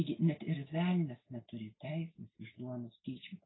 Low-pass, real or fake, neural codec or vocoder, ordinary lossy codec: 7.2 kHz; real; none; AAC, 16 kbps